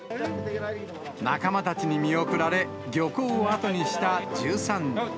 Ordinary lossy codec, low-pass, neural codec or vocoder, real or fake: none; none; none; real